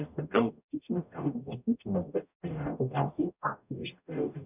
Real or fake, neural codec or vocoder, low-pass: fake; codec, 44.1 kHz, 0.9 kbps, DAC; 3.6 kHz